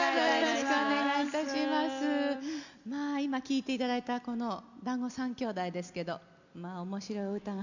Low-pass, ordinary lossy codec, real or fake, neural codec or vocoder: 7.2 kHz; none; real; none